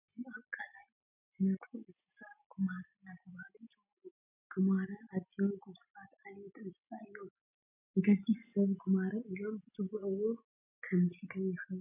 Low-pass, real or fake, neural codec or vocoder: 3.6 kHz; real; none